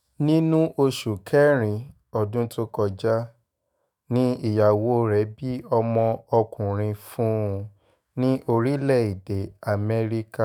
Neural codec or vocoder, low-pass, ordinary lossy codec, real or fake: autoencoder, 48 kHz, 128 numbers a frame, DAC-VAE, trained on Japanese speech; none; none; fake